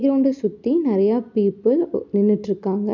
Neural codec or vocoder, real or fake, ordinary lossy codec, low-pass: none; real; MP3, 64 kbps; 7.2 kHz